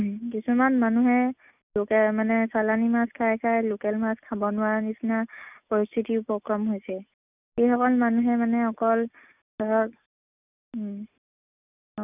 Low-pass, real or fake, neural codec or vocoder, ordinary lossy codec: 3.6 kHz; real; none; none